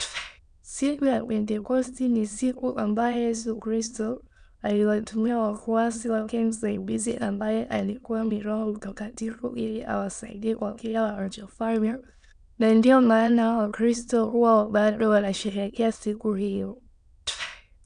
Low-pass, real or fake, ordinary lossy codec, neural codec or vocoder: 9.9 kHz; fake; none; autoencoder, 22.05 kHz, a latent of 192 numbers a frame, VITS, trained on many speakers